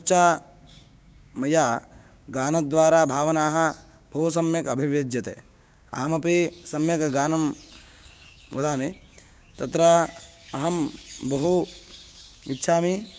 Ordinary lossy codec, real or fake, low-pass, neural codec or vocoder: none; fake; none; codec, 16 kHz, 6 kbps, DAC